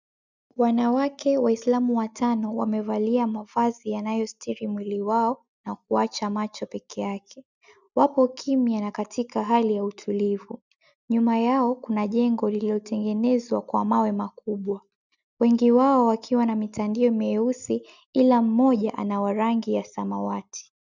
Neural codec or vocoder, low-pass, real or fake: none; 7.2 kHz; real